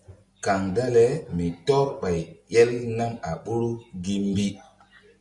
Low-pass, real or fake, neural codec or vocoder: 10.8 kHz; real; none